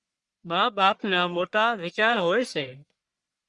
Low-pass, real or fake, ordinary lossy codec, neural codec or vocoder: 10.8 kHz; fake; Opus, 64 kbps; codec, 44.1 kHz, 1.7 kbps, Pupu-Codec